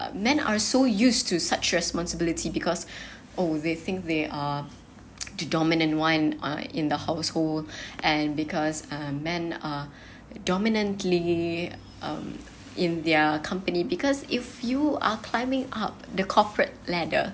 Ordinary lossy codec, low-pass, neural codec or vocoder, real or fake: none; none; none; real